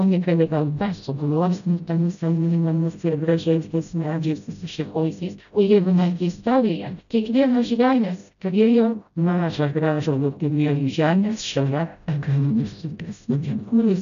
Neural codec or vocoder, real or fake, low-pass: codec, 16 kHz, 0.5 kbps, FreqCodec, smaller model; fake; 7.2 kHz